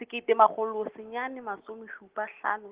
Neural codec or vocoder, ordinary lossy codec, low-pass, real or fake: none; Opus, 16 kbps; 3.6 kHz; real